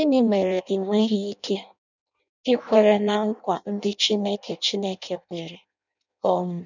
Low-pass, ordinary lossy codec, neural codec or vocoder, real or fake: 7.2 kHz; none; codec, 16 kHz in and 24 kHz out, 0.6 kbps, FireRedTTS-2 codec; fake